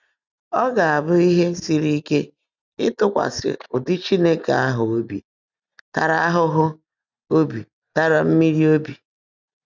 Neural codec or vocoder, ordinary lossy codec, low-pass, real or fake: none; none; 7.2 kHz; real